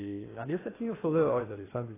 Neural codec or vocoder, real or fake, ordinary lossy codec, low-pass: codec, 16 kHz in and 24 kHz out, 0.8 kbps, FocalCodec, streaming, 65536 codes; fake; AAC, 16 kbps; 3.6 kHz